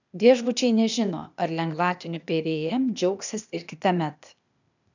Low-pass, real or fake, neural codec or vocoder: 7.2 kHz; fake; codec, 16 kHz, 0.8 kbps, ZipCodec